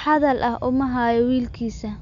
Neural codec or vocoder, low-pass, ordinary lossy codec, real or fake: none; 7.2 kHz; none; real